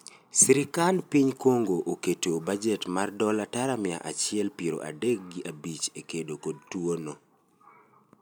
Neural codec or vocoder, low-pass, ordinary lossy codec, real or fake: none; none; none; real